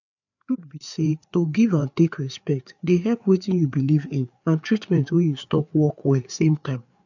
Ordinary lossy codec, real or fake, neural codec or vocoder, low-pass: none; fake; codec, 16 kHz, 4 kbps, FreqCodec, larger model; 7.2 kHz